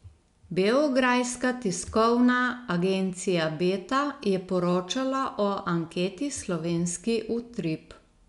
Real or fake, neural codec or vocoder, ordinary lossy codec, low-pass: real; none; none; 10.8 kHz